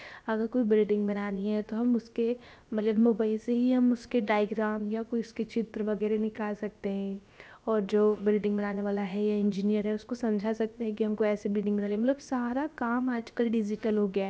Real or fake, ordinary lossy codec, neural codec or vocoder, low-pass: fake; none; codec, 16 kHz, about 1 kbps, DyCAST, with the encoder's durations; none